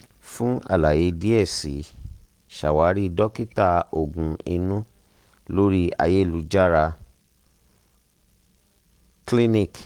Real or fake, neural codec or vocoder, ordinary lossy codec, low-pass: fake; codec, 44.1 kHz, 7.8 kbps, Pupu-Codec; Opus, 24 kbps; 19.8 kHz